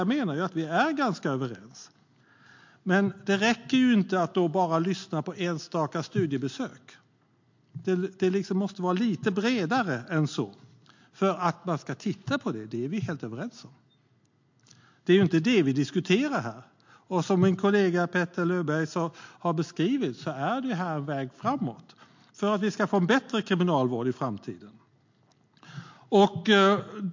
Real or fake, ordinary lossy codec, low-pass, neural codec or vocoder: real; MP3, 48 kbps; 7.2 kHz; none